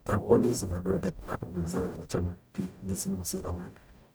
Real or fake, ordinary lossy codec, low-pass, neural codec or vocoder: fake; none; none; codec, 44.1 kHz, 0.9 kbps, DAC